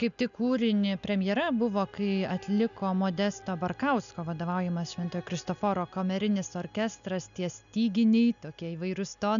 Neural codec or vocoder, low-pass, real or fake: none; 7.2 kHz; real